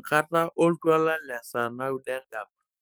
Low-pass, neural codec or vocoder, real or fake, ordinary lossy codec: none; codec, 44.1 kHz, 7.8 kbps, DAC; fake; none